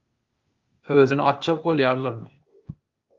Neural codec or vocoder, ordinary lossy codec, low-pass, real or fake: codec, 16 kHz, 0.8 kbps, ZipCodec; Opus, 32 kbps; 7.2 kHz; fake